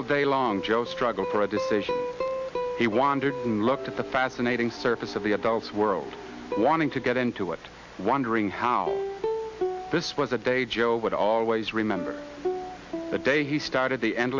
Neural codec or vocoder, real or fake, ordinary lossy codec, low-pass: none; real; MP3, 48 kbps; 7.2 kHz